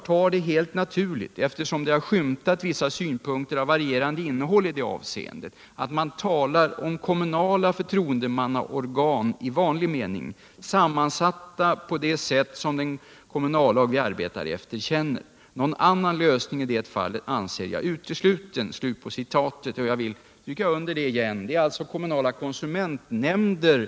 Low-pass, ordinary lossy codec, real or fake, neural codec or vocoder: none; none; real; none